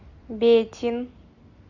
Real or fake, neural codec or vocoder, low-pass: real; none; 7.2 kHz